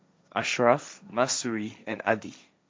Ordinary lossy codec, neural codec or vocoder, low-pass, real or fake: none; codec, 16 kHz, 1.1 kbps, Voila-Tokenizer; none; fake